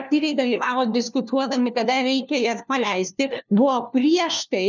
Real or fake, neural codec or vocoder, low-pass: fake; codec, 16 kHz, 1 kbps, FunCodec, trained on LibriTTS, 50 frames a second; 7.2 kHz